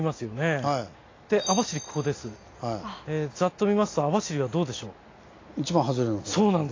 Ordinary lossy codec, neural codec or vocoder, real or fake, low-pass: AAC, 32 kbps; none; real; 7.2 kHz